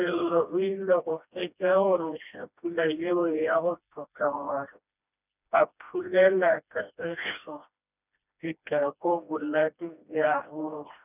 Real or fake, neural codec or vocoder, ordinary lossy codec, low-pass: fake; codec, 16 kHz, 1 kbps, FreqCodec, smaller model; none; 3.6 kHz